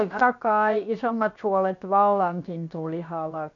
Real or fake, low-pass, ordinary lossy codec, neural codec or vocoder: fake; 7.2 kHz; none; codec, 16 kHz, about 1 kbps, DyCAST, with the encoder's durations